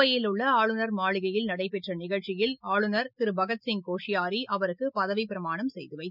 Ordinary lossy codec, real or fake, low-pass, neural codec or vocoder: none; real; 5.4 kHz; none